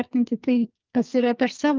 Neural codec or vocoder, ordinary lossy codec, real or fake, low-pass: codec, 44.1 kHz, 2.6 kbps, SNAC; Opus, 24 kbps; fake; 7.2 kHz